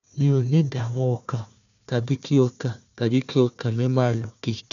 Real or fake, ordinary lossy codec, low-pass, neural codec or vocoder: fake; none; 7.2 kHz; codec, 16 kHz, 1 kbps, FunCodec, trained on Chinese and English, 50 frames a second